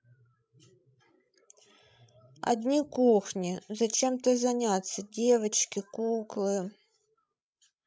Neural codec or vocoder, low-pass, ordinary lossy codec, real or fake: codec, 16 kHz, 16 kbps, FreqCodec, larger model; none; none; fake